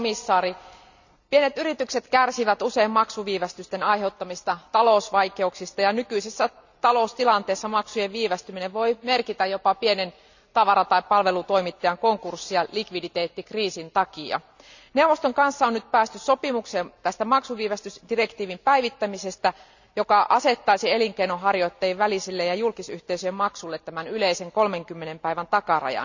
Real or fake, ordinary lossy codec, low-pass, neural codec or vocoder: real; none; 7.2 kHz; none